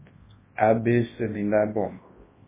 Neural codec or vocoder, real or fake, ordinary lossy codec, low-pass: codec, 24 kHz, 0.9 kbps, WavTokenizer, large speech release; fake; MP3, 16 kbps; 3.6 kHz